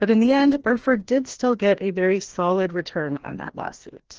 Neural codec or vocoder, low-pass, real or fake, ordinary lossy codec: codec, 16 kHz, 1 kbps, FreqCodec, larger model; 7.2 kHz; fake; Opus, 16 kbps